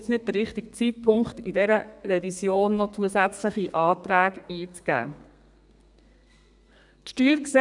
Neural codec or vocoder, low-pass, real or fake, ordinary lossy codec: codec, 32 kHz, 1.9 kbps, SNAC; 10.8 kHz; fake; none